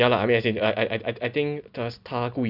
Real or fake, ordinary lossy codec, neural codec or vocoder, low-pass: real; none; none; 5.4 kHz